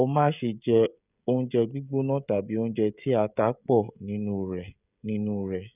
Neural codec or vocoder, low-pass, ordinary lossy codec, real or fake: codec, 16 kHz, 16 kbps, FreqCodec, smaller model; 3.6 kHz; none; fake